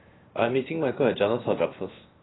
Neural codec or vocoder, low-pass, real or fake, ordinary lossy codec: codec, 16 kHz, 0.7 kbps, FocalCodec; 7.2 kHz; fake; AAC, 16 kbps